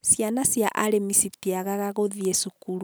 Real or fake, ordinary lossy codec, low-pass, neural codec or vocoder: real; none; none; none